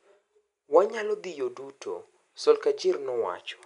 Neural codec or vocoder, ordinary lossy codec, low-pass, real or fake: none; none; 10.8 kHz; real